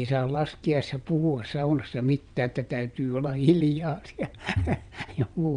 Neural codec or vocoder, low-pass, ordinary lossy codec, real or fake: vocoder, 22.05 kHz, 80 mel bands, WaveNeXt; 9.9 kHz; none; fake